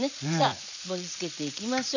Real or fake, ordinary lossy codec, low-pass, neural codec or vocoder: fake; none; 7.2 kHz; vocoder, 44.1 kHz, 80 mel bands, Vocos